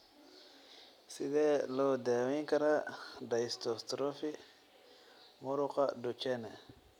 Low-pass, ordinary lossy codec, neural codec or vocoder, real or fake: 19.8 kHz; none; none; real